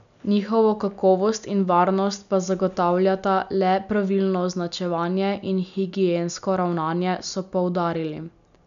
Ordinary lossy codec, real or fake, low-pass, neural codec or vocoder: none; real; 7.2 kHz; none